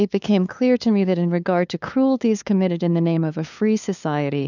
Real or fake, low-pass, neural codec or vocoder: fake; 7.2 kHz; codec, 16 kHz, 2 kbps, FunCodec, trained on LibriTTS, 25 frames a second